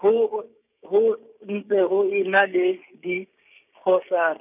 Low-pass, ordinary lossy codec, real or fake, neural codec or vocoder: 3.6 kHz; none; fake; codec, 16 kHz, 6 kbps, DAC